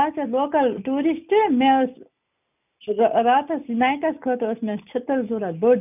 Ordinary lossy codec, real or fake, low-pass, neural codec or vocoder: none; real; 3.6 kHz; none